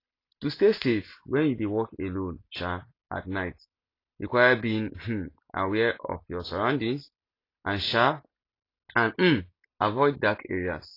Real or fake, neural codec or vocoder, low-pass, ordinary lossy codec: real; none; 5.4 kHz; AAC, 32 kbps